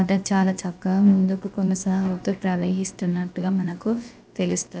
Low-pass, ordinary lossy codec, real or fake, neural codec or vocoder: none; none; fake; codec, 16 kHz, about 1 kbps, DyCAST, with the encoder's durations